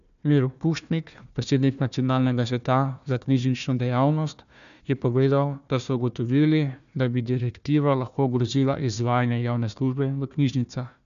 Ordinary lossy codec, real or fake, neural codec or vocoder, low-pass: none; fake; codec, 16 kHz, 1 kbps, FunCodec, trained on Chinese and English, 50 frames a second; 7.2 kHz